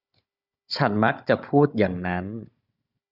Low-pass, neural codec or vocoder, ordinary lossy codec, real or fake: 5.4 kHz; codec, 16 kHz, 16 kbps, FunCodec, trained on Chinese and English, 50 frames a second; Opus, 64 kbps; fake